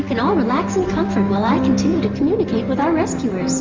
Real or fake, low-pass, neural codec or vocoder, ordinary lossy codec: real; 7.2 kHz; none; Opus, 32 kbps